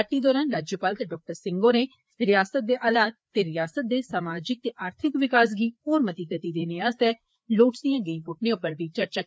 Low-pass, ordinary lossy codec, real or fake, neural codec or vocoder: none; none; fake; codec, 16 kHz, 4 kbps, FreqCodec, larger model